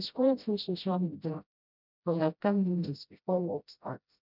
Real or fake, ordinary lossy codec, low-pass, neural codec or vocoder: fake; none; 5.4 kHz; codec, 16 kHz, 0.5 kbps, FreqCodec, smaller model